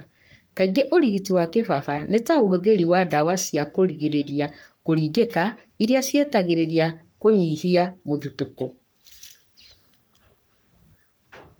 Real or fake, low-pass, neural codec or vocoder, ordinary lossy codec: fake; none; codec, 44.1 kHz, 3.4 kbps, Pupu-Codec; none